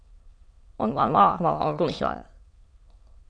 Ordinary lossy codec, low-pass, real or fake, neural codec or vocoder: AAC, 48 kbps; 9.9 kHz; fake; autoencoder, 22.05 kHz, a latent of 192 numbers a frame, VITS, trained on many speakers